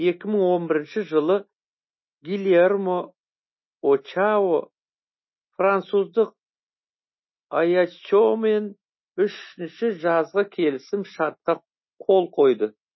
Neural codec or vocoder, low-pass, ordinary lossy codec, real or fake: none; 7.2 kHz; MP3, 24 kbps; real